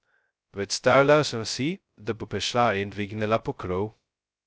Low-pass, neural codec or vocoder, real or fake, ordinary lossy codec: none; codec, 16 kHz, 0.2 kbps, FocalCodec; fake; none